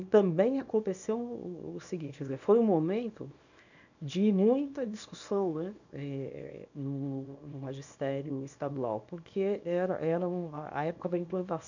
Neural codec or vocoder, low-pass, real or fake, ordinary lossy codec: codec, 24 kHz, 0.9 kbps, WavTokenizer, small release; 7.2 kHz; fake; none